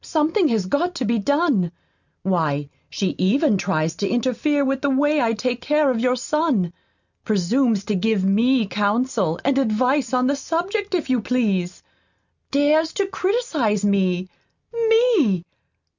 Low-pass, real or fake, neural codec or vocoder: 7.2 kHz; real; none